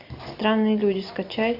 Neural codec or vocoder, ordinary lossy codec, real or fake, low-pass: none; AAC, 48 kbps; real; 5.4 kHz